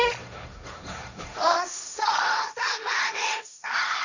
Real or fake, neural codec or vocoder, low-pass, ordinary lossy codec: fake; codec, 16 kHz, 1.1 kbps, Voila-Tokenizer; 7.2 kHz; none